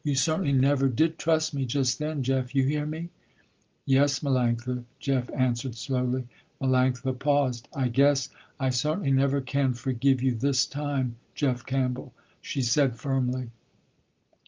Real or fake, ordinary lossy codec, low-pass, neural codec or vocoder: real; Opus, 24 kbps; 7.2 kHz; none